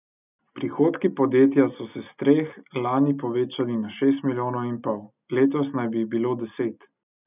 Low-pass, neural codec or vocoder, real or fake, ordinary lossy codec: 3.6 kHz; none; real; none